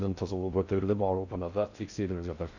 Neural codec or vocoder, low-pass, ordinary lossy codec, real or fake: codec, 16 kHz in and 24 kHz out, 0.6 kbps, FocalCodec, streaming, 2048 codes; 7.2 kHz; none; fake